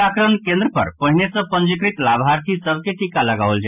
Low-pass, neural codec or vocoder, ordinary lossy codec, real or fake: 3.6 kHz; none; none; real